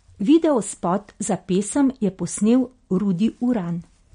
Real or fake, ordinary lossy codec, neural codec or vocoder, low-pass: real; MP3, 48 kbps; none; 9.9 kHz